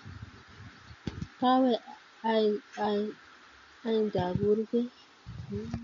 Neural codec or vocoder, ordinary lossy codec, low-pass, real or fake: none; MP3, 48 kbps; 7.2 kHz; real